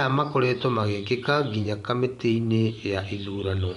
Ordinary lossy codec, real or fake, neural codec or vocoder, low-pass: none; fake; vocoder, 24 kHz, 100 mel bands, Vocos; 10.8 kHz